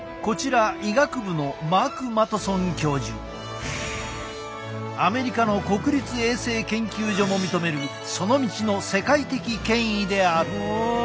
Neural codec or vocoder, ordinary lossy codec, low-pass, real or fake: none; none; none; real